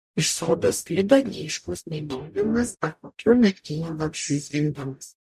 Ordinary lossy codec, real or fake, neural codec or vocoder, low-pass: MP3, 64 kbps; fake; codec, 44.1 kHz, 0.9 kbps, DAC; 14.4 kHz